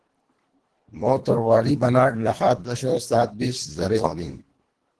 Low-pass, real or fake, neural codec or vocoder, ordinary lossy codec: 10.8 kHz; fake; codec, 24 kHz, 1.5 kbps, HILCodec; Opus, 16 kbps